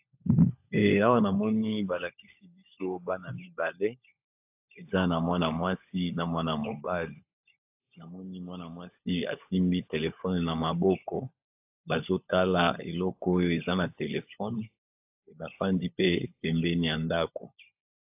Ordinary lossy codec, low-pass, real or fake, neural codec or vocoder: AAC, 32 kbps; 3.6 kHz; fake; codec, 16 kHz, 16 kbps, FunCodec, trained on LibriTTS, 50 frames a second